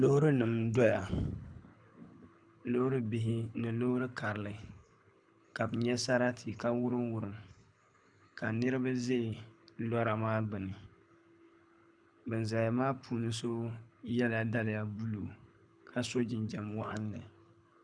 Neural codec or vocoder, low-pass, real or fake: codec, 24 kHz, 6 kbps, HILCodec; 9.9 kHz; fake